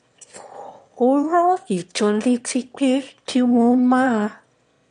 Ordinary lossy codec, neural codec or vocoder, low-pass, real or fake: MP3, 64 kbps; autoencoder, 22.05 kHz, a latent of 192 numbers a frame, VITS, trained on one speaker; 9.9 kHz; fake